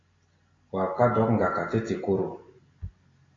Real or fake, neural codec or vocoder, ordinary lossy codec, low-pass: real; none; AAC, 32 kbps; 7.2 kHz